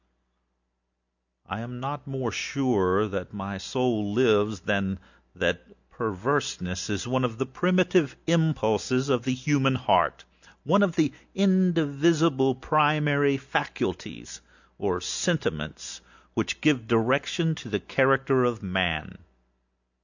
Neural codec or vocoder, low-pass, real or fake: none; 7.2 kHz; real